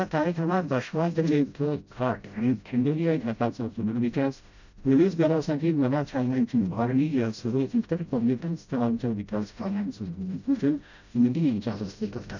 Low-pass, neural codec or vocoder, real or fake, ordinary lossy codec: 7.2 kHz; codec, 16 kHz, 0.5 kbps, FreqCodec, smaller model; fake; none